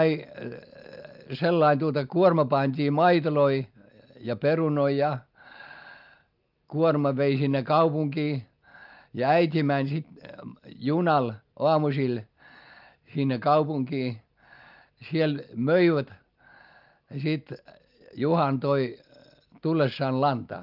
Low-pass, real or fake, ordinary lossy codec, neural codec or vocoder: 5.4 kHz; real; Opus, 24 kbps; none